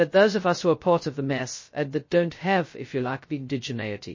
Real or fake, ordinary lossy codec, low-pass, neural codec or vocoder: fake; MP3, 32 kbps; 7.2 kHz; codec, 16 kHz, 0.2 kbps, FocalCodec